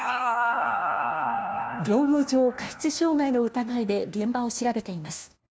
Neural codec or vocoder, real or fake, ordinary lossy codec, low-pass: codec, 16 kHz, 1 kbps, FunCodec, trained on LibriTTS, 50 frames a second; fake; none; none